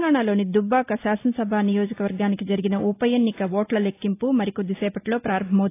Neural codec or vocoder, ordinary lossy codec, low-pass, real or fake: none; AAC, 24 kbps; 3.6 kHz; real